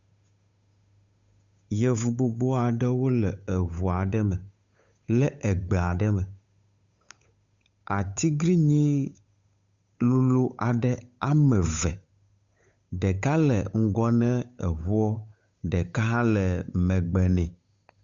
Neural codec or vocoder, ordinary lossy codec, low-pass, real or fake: codec, 16 kHz, 8 kbps, FunCodec, trained on Chinese and English, 25 frames a second; Opus, 64 kbps; 7.2 kHz; fake